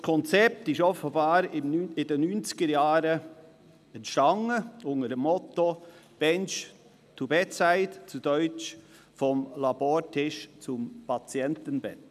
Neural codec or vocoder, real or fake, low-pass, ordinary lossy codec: none; real; 14.4 kHz; none